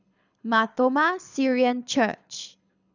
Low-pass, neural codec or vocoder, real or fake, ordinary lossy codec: 7.2 kHz; codec, 24 kHz, 6 kbps, HILCodec; fake; none